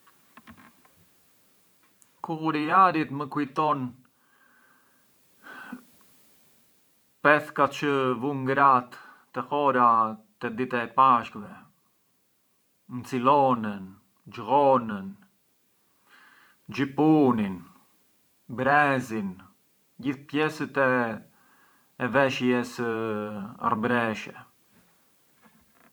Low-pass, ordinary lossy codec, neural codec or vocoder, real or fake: none; none; vocoder, 44.1 kHz, 128 mel bands every 512 samples, BigVGAN v2; fake